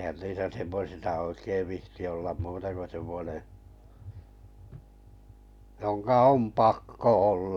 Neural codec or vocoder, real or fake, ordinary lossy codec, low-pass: none; real; none; 19.8 kHz